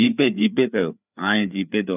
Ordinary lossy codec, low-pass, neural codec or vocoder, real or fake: none; 3.6 kHz; codec, 16 kHz, 4 kbps, FreqCodec, larger model; fake